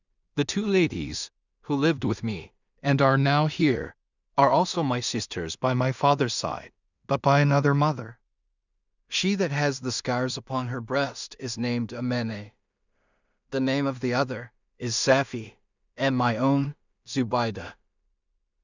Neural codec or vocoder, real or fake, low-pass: codec, 16 kHz in and 24 kHz out, 0.4 kbps, LongCat-Audio-Codec, two codebook decoder; fake; 7.2 kHz